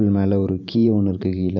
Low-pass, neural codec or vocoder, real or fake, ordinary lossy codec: 7.2 kHz; vocoder, 44.1 kHz, 128 mel bands every 512 samples, BigVGAN v2; fake; none